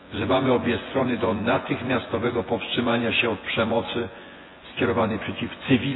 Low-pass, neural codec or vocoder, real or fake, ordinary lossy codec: 7.2 kHz; vocoder, 24 kHz, 100 mel bands, Vocos; fake; AAC, 16 kbps